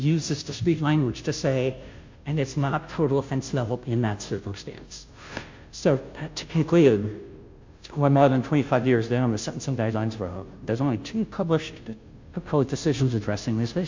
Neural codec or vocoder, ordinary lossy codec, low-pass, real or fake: codec, 16 kHz, 0.5 kbps, FunCodec, trained on Chinese and English, 25 frames a second; MP3, 48 kbps; 7.2 kHz; fake